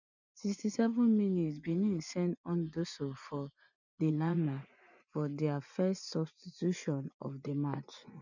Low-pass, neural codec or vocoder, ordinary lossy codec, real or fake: 7.2 kHz; vocoder, 24 kHz, 100 mel bands, Vocos; none; fake